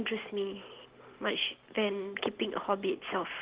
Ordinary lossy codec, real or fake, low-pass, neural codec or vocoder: Opus, 16 kbps; real; 3.6 kHz; none